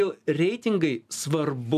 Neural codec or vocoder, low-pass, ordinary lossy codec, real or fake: none; 14.4 kHz; MP3, 96 kbps; real